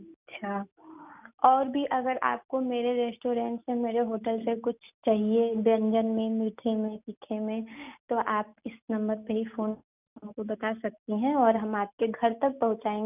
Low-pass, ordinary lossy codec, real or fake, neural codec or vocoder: 3.6 kHz; none; real; none